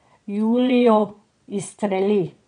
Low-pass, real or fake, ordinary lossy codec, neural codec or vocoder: 9.9 kHz; fake; MP3, 64 kbps; vocoder, 22.05 kHz, 80 mel bands, Vocos